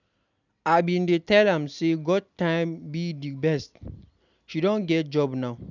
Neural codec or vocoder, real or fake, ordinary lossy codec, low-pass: none; real; none; 7.2 kHz